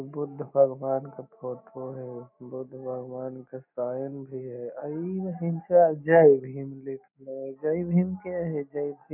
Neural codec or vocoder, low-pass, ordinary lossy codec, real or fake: none; 3.6 kHz; none; real